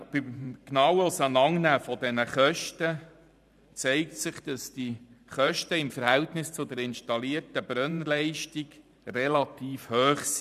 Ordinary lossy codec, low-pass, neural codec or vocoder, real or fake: none; 14.4 kHz; vocoder, 44.1 kHz, 128 mel bands every 512 samples, BigVGAN v2; fake